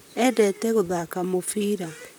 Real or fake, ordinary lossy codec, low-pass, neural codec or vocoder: fake; none; none; vocoder, 44.1 kHz, 128 mel bands, Pupu-Vocoder